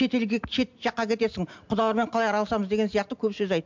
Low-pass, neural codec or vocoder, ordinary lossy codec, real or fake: 7.2 kHz; none; MP3, 64 kbps; real